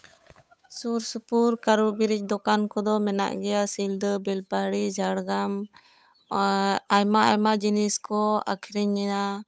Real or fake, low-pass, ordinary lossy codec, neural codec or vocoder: fake; none; none; codec, 16 kHz, 8 kbps, FunCodec, trained on Chinese and English, 25 frames a second